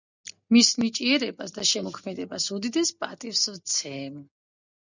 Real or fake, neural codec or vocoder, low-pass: real; none; 7.2 kHz